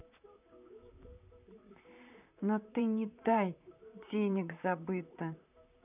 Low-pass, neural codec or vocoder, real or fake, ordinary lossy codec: 3.6 kHz; vocoder, 44.1 kHz, 128 mel bands, Pupu-Vocoder; fake; none